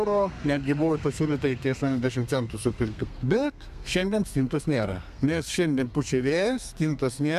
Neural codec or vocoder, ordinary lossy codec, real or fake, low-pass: codec, 32 kHz, 1.9 kbps, SNAC; MP3, 96 kbps; fake; 14.4 kHz